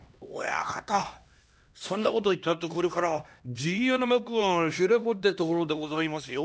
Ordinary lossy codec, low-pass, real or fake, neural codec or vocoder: none; none; fake; codec, 16 kHz, 1 kbps, X-Codec, HuBERT features, trained on LibriSpeech